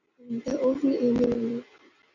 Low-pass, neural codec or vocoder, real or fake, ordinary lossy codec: 7.2 kHz; none; real; AAC, 48 kbps